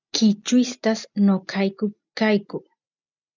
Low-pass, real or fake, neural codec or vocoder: 7.2 kHz; real; none